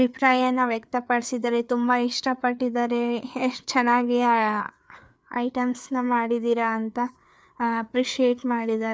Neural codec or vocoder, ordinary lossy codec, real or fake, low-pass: codec, 16 kHz, 4 kbps, FreqCodec, larger model; none; fake; none